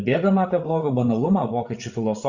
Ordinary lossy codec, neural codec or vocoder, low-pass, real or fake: Opus, 64 kbps; codec, 16 kHz, 8 kbps, FreqCodec, larger model; 7.2 kHz; fake